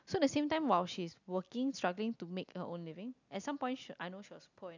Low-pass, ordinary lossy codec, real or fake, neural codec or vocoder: 7.2 kHz; none; real; none